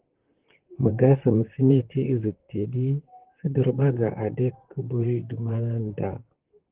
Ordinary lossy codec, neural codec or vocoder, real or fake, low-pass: Opus, 16 kbps; vocoder, 44.1 kHz, 128 mel bands, Pupu-Vocoder; fake; 3.6 kHz